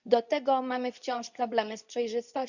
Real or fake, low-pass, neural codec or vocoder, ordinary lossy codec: fake; 7.2 kHz; codec, 24 kHz, 0.9 kbps, WavTokenizer, medium speech release version 2; none